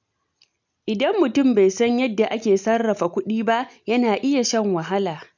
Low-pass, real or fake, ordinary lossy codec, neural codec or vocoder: 7.2 kHz; real; none; none